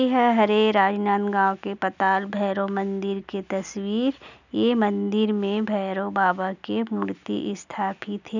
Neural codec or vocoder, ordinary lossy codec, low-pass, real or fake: none; none; 7.2 kHz; real